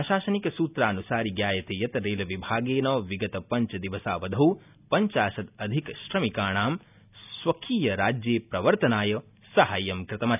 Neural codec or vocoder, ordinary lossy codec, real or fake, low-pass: none; none; real; 3.6 kHz